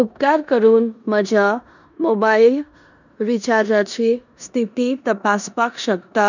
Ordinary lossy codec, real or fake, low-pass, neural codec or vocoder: none; fake; 7.2 kHz; codec, 16 kHz in and 24 kHz out, 0.9 kbps, LongCat-Audio-Codec, four codebook decoder